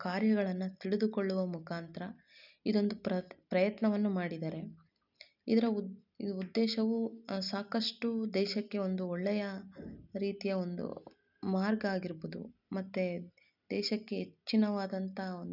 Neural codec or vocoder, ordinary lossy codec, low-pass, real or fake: none; none; 5.4 kHz; real